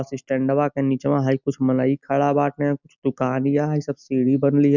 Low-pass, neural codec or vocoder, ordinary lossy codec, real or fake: 7.2 kHz; none; none; real